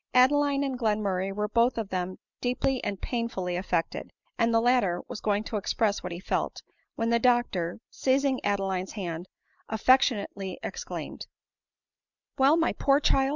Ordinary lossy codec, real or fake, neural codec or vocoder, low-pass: Opus, 64 kbps; real; none; 7.2 kHz